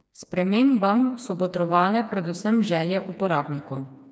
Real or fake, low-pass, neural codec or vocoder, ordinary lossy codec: fake; none; codec, 16 kHz, 2 kbps, FreqCodec, smaller model; none